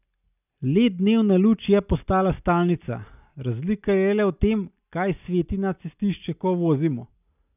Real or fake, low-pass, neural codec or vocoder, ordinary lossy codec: real; 3.6 kHz; none; none